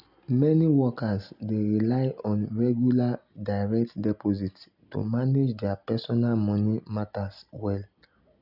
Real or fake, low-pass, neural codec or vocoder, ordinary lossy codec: real; 5.4 kHz; none; none